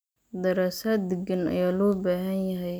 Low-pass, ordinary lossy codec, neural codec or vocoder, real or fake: none; none; none; real